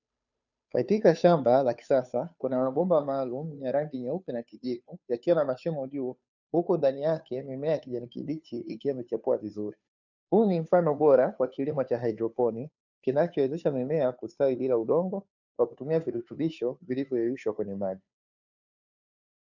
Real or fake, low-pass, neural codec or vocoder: fake; 7.2 kHz; codec, 16 kHz, 2 kbps, FunCodec, trained on Chinese and English, 25 frames a second